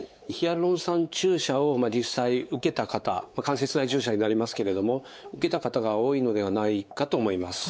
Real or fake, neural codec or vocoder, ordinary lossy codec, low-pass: fake; codec, 16 kHz, 4 kbps, X-Codec, WavLM features, trained on Multilingual LibriSpeech; none; none